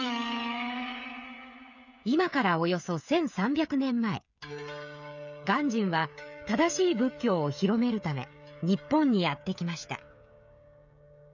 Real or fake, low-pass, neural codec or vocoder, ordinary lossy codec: fake; 7.2 kHz; codec, 16 kHz, 16 kbps, FreqCodec, smaller model; none